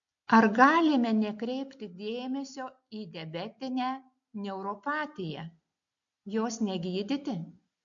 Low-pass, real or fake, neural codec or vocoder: 7.2 kHz; real; none